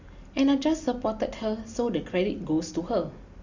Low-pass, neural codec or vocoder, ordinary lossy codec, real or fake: 7.2 kHz; none; Opus, 64 kbps; real